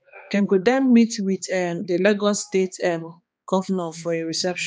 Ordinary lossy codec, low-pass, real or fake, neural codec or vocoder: none; none; fake; codec, 16 kHz, 2 kbps, X-Codec, HuBERT features, trained on balanced general audio